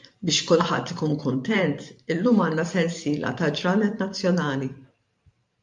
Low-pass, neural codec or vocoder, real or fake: 10.8 kHz; vocoder, 44.1 kHz, 128 mel bands every 512 samples, BigVGAN v2; fake